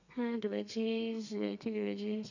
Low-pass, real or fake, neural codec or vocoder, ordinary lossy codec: 7.2 kHz; fake; codec, 32 kHz, 1.9 kbps, SNAC; none